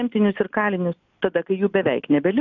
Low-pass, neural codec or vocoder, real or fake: 7.2 kHz; none; real